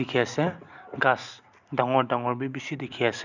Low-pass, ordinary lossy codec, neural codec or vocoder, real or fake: 7.2 kHz; none; none; real